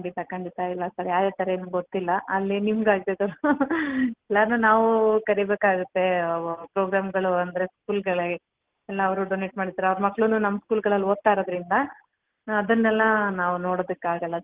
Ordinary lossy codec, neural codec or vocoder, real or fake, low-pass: Opus, 16 kbps; none; real; 3.6 kHz